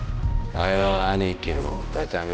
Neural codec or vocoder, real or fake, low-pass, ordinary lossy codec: codec, 16 kHz, 1 kbps, X-Codec, HuBERT features, trained on balanced general audio; fake; none; none